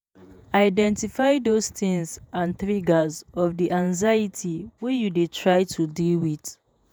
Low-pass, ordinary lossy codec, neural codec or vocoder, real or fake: none; none; vocoder, 48 kHz, 128 mel bands, Vocos; fake